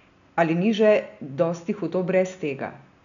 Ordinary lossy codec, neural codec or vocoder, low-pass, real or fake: none; none; 7.2 kHz; real